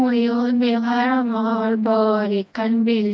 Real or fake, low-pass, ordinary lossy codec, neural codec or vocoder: fake; none; none; codec, 16 kHz, 1 kbps, FreqCodec, smaller model